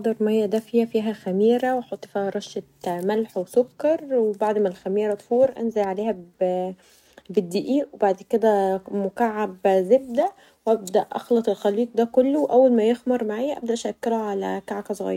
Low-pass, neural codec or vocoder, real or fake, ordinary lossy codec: 19.8 kHz; none; real; none